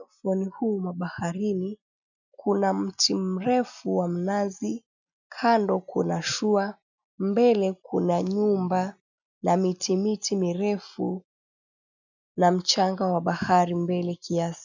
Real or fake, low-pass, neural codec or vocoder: real; 7.2 kHz; none